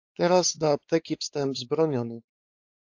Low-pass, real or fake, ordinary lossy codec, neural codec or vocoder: 7.2 kHz; fake; AAC, 48 kbps; codec, 16 kHz, 4 kbps, X-Codec, WavLM features, trained on Multilingual LibriSpeech